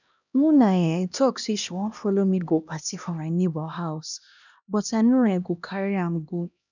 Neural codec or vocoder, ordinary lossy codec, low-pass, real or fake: codec, 16 kHz, 1 kbps, X-Codec, HuBERT features, trained on LibriSpeech; none; 7.2 kHz; fake